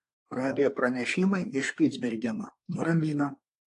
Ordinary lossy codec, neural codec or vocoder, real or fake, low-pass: AAC, 48 kbps; codec, 24 kHz, 1 kbps, SNAC; fake; 10.8 kHz